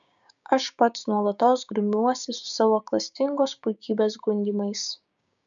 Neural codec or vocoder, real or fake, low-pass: codec, 16 kHz, 6 kbps, DAC; fake; 7.2 kHz